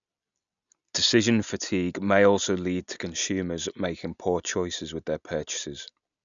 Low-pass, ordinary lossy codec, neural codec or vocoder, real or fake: 7.2 kHz; none; none; real